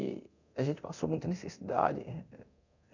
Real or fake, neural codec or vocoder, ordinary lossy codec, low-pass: fake; codec, 24 kHz, 0.9 kbps, DualCodec; none; 7.2 kHz